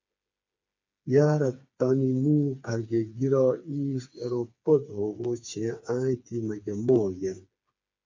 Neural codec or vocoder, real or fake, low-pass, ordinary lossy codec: codec, 16 kHz, 4 kbps, FreqCodec, smaller model; fake; 7.2 kHz; MP3, 48 kbps